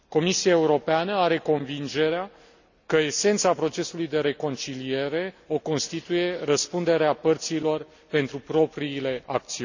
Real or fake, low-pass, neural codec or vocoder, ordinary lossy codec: real; 7.2 kHz; none; none